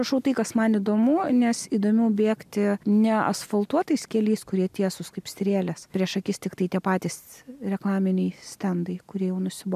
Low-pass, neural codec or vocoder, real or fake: 14.4 kHz; none; real